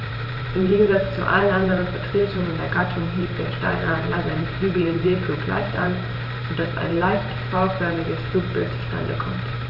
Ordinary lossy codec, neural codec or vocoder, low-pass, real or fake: none; vocoder, 44.1 kHz, 128 mel bands every 512 samples, BigVGAN v2; 5.4 kHz; fake